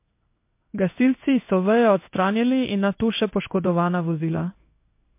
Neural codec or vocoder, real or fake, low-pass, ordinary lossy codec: codec, 16 kHz in and 24 kHz out, 1 kbps, XY-Tokenizer; fake; 3.6 kHz; MP3, 24 kbps